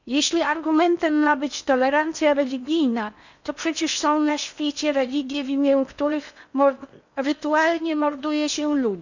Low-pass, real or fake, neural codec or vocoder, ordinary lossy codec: 7.2 kHz; fake; codec, 16 kHz in and 24 kHz out, 0.8 kbps, FocalCodec, streaming, 65536 codes; none